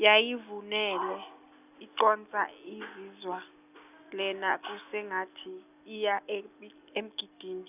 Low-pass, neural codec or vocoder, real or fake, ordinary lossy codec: 3.6 kHz; none; real; none